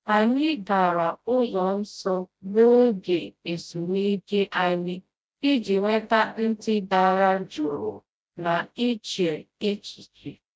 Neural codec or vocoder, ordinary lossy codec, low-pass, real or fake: codec, 16 kHz, 0.5 kbps, FreqCodec, smaller model; none; none; fake